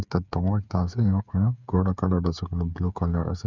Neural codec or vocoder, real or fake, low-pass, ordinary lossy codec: codec, 16 kHz, 8 kbps, FreqCodec, smaller model; fake; 7.2 kHz; none